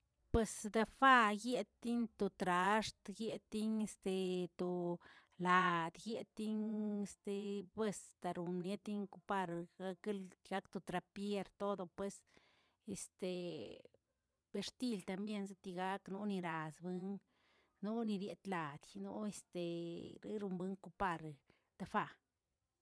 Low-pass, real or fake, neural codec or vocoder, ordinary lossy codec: none; fake; vocoder, 22.05 kHz, 80 mel bands, Vocos; none